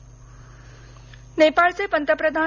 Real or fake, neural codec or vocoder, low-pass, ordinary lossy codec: real; none; 7.2 kHz; none